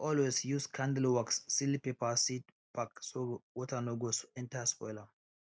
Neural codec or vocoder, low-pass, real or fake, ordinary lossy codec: none; none; real; none